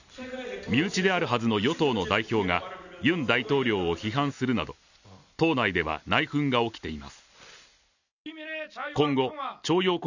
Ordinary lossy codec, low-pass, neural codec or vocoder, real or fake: none; 7.2 kHz; none; real